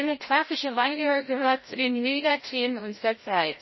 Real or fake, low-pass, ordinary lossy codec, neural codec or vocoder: fake; 7.2 kHz; MP3, 24 kbps; codec, 16 kHz, 0.5 kbps, FreqCodec, larger model